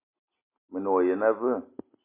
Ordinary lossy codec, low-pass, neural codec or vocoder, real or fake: MP3, 24 kbps; 3.6 kHz; none; real